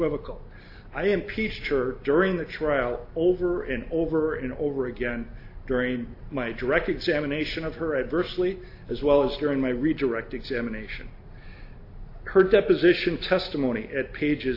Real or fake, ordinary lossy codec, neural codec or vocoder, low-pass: real; AAC, 32 kbps; none; 5.4 kHz